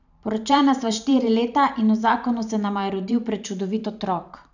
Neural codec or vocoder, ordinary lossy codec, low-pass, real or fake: vocoder, 44.1 kHz, 128 mel bands every 512 samples, BigVGAN v2; none; 7.2 kHz; fake